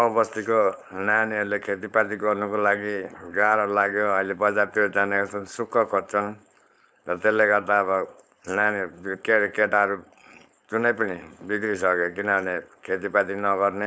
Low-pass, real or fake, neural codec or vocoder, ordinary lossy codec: none; fake; codec, 16 kHz, 4.8 kbps, FACodec; none